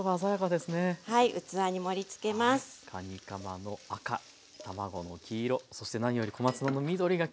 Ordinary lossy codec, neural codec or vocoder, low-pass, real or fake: none; none; none; real